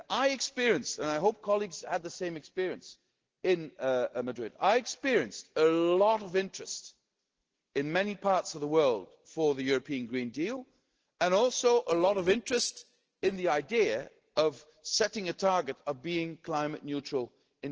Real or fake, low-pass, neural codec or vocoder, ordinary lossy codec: real; 7.2 kHz; none; Opus, 16 kbps